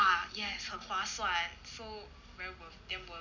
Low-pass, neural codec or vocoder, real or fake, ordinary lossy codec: 7.2 kHz; none; real; none